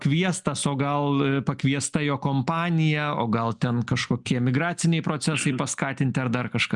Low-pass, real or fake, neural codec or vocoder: 10.8 kHz; real; none